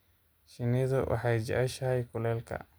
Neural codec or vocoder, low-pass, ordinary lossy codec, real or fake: none; none; none; real